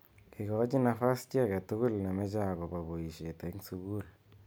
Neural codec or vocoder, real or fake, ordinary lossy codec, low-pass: none; real; none; none